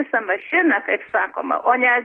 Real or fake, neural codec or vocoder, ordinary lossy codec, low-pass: real; none; AAC, 32 kbps; 10.8 kHz